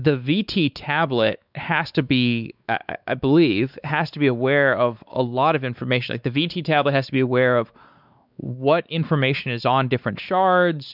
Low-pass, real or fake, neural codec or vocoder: 5.4 kHz; fake; codec, 16 kHz, 4 kbps, X-Codec, WavLM features, trained on Multilingual LibriSpeech